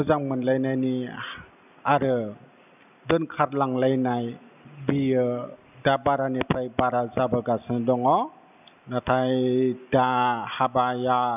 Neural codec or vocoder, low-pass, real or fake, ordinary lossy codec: none; 3.6 kHz; real; none